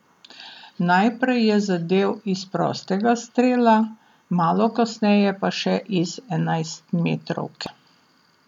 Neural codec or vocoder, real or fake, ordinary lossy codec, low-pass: none; real; none; 19.8 kHz